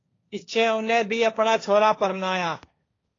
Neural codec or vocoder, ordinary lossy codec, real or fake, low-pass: codec, 16 kHz, 1.1 kbps, Voila-Tokenizer; AAC, 32 kbps; fake; 7.2 kHz